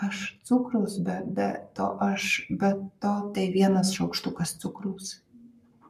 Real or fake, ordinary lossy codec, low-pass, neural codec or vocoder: fake; MP3, 96 kbps; 14.4 kHz; codec, 44.1 kHz, 7.8 kbps, Pupu-Codec